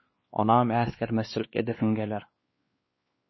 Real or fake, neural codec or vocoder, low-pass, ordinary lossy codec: fake; codec, 16 kHz, 2 kbps, X-Codec, WavLM features, trained on Multilingual LibriSpeech; 7.2 kHz; MP3, 24 kbps